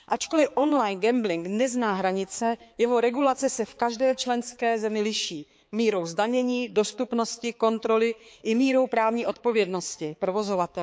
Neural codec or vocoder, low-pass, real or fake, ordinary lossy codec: codec, 16 kHz, 4 kbps, X-Codec, HuBERT features, trained on balanced general audio; none; fake; none